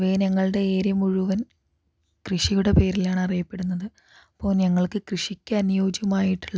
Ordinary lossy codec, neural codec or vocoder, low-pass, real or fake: none; none; none; real